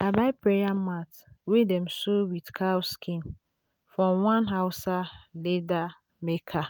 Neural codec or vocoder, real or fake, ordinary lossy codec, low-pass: none; real; none; none